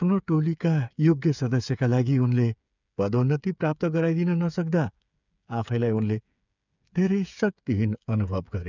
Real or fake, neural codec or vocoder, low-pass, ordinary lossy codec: fake; codec, 16 kHz, 8 kbps, FreqCodec, smaller model; 7.2 kHz; none